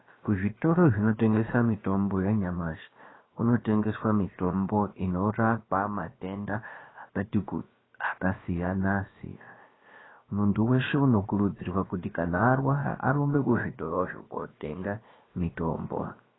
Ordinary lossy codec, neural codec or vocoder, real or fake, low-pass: AAC, 16 kbps; codec, 16 kHz, about 1 kbps, DyCAST, with the encoder's durations; fake; 7.2 kHz